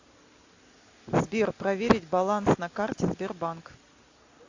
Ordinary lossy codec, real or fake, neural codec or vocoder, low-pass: AAC, 48 kbps; real; none; 7.2 kHz